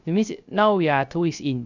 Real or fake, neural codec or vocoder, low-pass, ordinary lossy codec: fake; codec, 16 kHz, 0.3 kbps, FocalCodec; 7.2 kHz; none